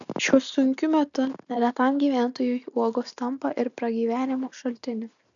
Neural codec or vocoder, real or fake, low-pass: none; real; 7.2 kHz